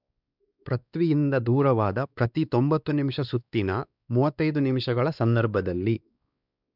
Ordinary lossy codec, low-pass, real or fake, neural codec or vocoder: none; 5.4 kHz; fake; codec, 16 kHz, 2 kbps, X-Codec, WavLM features, trained on Multilingual LibriSpeech